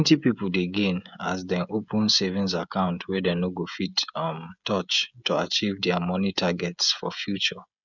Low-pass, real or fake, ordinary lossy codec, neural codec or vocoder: 7.2 kHz; fake; none; codec, 16 kHz, 16 kbps, FreqCodec, smaller model